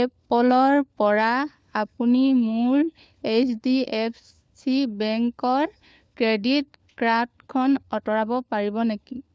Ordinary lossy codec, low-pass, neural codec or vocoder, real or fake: none; none; codec, 16 kHz, 4 kbps, FunCodec, trained on LibriTTS, 50 frames a second; fake